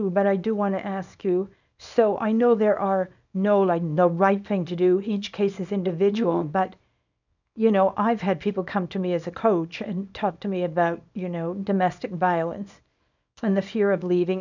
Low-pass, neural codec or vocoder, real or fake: 7.2 kHz; codec, 24 kHz, 0.9 kbps, WavTokenizer, small release; fake